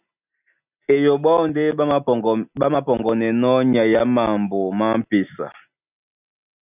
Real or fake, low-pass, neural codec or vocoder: real; 3.6 kHz; none